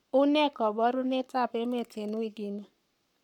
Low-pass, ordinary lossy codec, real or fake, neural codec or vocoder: 19.8 kHz; none; fake; codec, 44.1 kHz, 7.8 kbps, Pupu-Codec